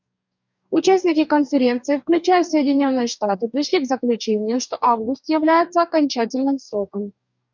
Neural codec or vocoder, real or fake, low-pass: codec, 44.1 kHz, 2.6 kbps, DAC; fake; 7.2 kHz